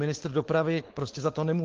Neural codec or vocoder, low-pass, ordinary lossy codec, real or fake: codec, 16 kHz, 4.8 kbps, FACodec; 7.2 kHz; Opus, 16 kbps; fake